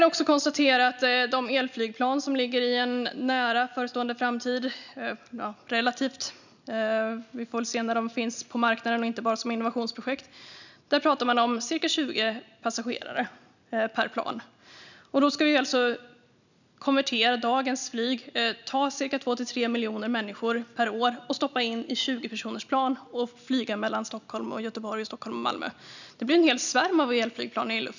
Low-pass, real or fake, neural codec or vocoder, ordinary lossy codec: 7.2 kHz; real; none; none